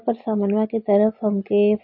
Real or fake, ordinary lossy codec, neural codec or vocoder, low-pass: real; MP3, 32 kbps; none; 5.4 kHz